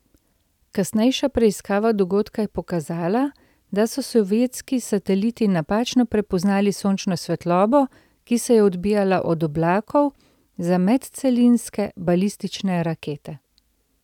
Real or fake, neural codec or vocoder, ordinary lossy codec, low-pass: real; none; none; 19.8 kHz